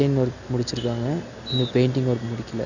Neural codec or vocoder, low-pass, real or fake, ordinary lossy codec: none; 7.2 kHz; real; none